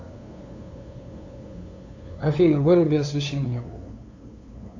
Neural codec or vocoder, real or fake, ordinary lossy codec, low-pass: codec, 16 kHz, 2 kbps, FunCodec, trained on LibriTTS, 25 frames a second; fake; AAC, 48 kbps; 7.2 kHz